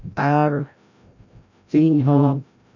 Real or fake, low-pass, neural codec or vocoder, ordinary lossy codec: fake; 7.2 kHz; codec, 16 kHz, 0.5 kbps, FreqCodec, larger model; none